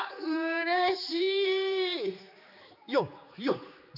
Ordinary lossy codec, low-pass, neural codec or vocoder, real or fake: none; 5.4 kHz; codec, 16 kHz, 4 kbps, X-Codec, HuBERT features, trained on general audio; fake